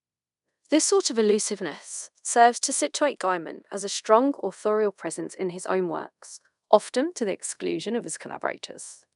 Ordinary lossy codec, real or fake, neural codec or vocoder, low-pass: none; fake; codec, 24 kHz, 0.5 kbps, DualCodec; 10.8 kHz